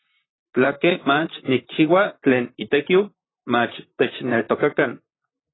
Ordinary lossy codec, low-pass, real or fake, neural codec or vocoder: AAC, 16 kbps; 7.2 kHz; fake; vocoder, 44.1 kHz, 128 mel bands, Pupu-Vocoder